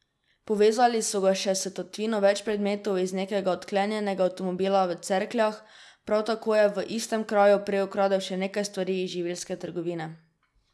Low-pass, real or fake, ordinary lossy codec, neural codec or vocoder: none; real; none; none